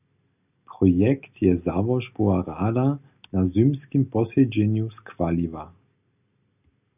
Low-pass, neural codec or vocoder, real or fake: 3.6 kHz; none; real